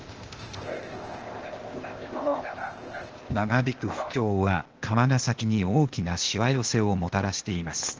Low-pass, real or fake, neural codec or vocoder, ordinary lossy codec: 7.2 kHz; fake; codec, 16 kHz, 0.8 kbps, ZipCodec; Opus, 16 kbps